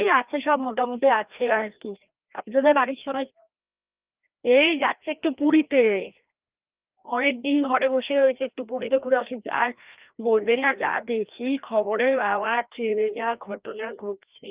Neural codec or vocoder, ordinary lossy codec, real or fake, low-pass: codec, 16 kHz, 1 kbps, FreqCodec, larger model; Opus, 32 kbps; fake; 3.6 kHz